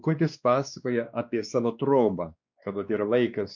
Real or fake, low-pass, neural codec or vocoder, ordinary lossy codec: fake; 7.2 kHz; codec, 16 kHz, 2 kbps, X-Codec, WavLM features, trained on Multilingual LibriSpeech; MP3, 64 kbps